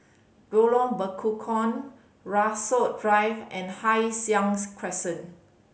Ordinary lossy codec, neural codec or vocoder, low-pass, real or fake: none; none; none; real